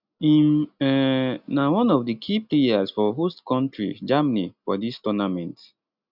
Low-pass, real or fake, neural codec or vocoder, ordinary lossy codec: 5.4 kHz; real; none; none